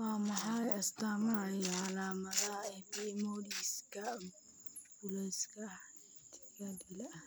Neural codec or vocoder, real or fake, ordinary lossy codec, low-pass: none; real; none; none